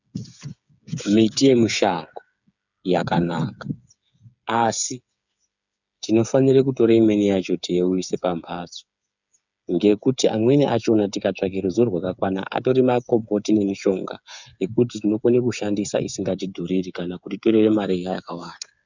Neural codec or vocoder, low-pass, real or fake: codec, 16 kHz, 8 kbps, FreqCodec, smaller model; 7.2 kHz; fake